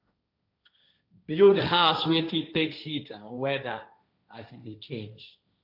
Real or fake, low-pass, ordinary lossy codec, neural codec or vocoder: fake; 5.4 kHz; none; codec, 16 kHz, 1.1 kbps, Voila-Tokenizer